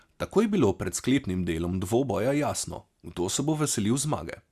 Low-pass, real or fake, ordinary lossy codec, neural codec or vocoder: 14.4 kHz; real; Opus, 64 kbps; none